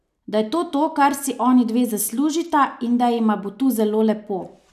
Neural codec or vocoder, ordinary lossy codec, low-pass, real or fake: none; none; 14.4 kHz; real